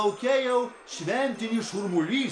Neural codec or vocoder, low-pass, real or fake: none; 9.9 kHz; real